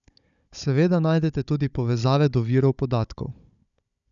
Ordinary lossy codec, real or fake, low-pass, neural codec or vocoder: none; fake; 7.2 kHz; codec, 16 kHz, 16 kbps, FunCodec, trained on Chinese and English, 50 frames a second